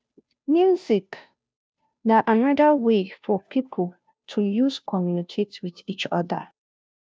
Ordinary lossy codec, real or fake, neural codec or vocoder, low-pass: none; fake; codec, 16 kHz, 0.5 kbps, FunCodec, trained on Chinese and English, 25 frames a second; none